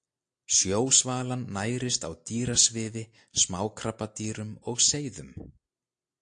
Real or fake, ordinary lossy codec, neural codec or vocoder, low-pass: real; AAC, 64 kbps; none; 9.9 kHz